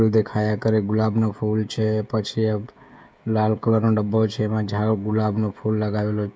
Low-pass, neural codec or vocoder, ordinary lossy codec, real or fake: none; codec, 16 kHz, 16 kbps, FreqCodec, smaller model; none; fake